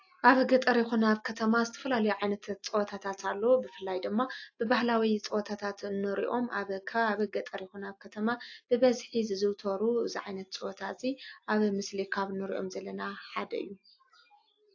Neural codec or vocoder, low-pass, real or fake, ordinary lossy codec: none; 7.2 kHz; real; AAC, 48 kbps